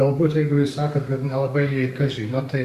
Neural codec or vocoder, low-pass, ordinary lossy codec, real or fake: codec, 32 kHz, 1.9 kbps, SNAC; 14.4 kHz; Opus, 64 kbps; fake